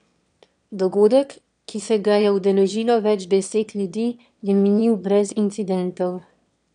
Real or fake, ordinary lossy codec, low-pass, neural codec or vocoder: fake; none; 9.9 kHz; autoencoder, 22.05 kHz, a latent of 192 numbers a frame, VITS, trained on one speaker